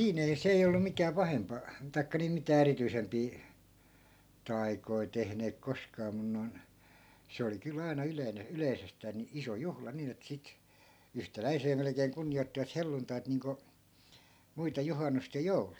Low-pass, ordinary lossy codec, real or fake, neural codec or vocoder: none; none; real; none